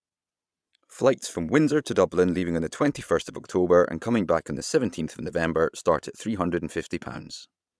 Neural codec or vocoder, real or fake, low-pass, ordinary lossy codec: vocoder, 22.05 kHz, 80 mel bands, Vocos; fake; none; none